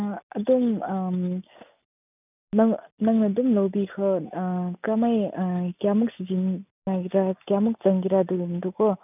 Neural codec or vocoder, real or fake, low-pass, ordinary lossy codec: none; real; 3.6 kHz; none